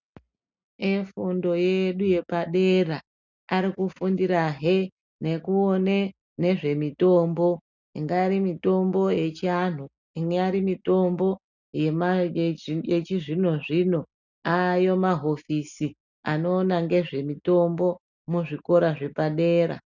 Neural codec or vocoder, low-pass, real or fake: none; 7.2 kHz; real